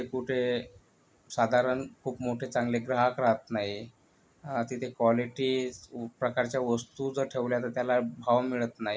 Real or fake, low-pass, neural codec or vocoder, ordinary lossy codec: real; none; none; none